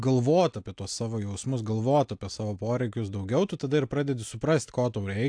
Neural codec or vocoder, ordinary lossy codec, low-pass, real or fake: none; AAC, 64 kbps; 9.9 kHz; real